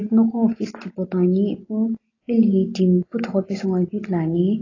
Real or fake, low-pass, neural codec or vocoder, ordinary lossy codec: real; 7.2 kHz; none; AAC, 32 kbps